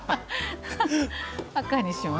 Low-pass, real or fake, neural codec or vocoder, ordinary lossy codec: none; real; none; none